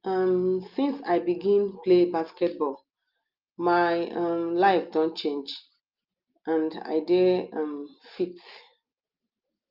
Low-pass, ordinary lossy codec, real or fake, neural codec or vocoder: 5.4 kHz; Opus, 32 kbps; real; none